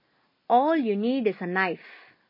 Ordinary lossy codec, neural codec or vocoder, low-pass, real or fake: MP3, 24 kbps; none; 5.4 kHz; real